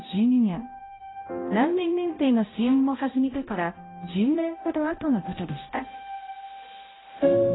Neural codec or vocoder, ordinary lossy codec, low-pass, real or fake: codec, 16 kHz, 0.5 kbps, X-Codec, HuBERT features, trained on balanced general audio; AAC, 16 kbps; 7.2 kHz; fake